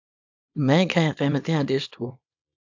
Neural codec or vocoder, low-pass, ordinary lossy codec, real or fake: codec, 24 kHz, 0.9 kbps, WavTokenizer, small release; 7.2 kHz; AAC, 48 kbps; fake